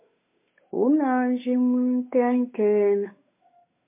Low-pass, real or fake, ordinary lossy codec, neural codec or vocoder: 3.6 kHz; fake; MP3, 24 kbps; codec, 16 kHz, 8 kbps, FunCodec, trained on Chinese and English, 25 frames a second